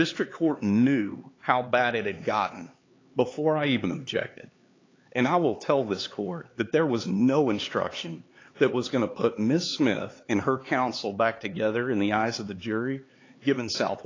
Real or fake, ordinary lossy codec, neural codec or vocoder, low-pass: fake; AAC, 32 kbps; codec, 16 kHz, 4 kbps, X-Codec, HuBERT features, trained on LibriSpeech; 7.2 kHz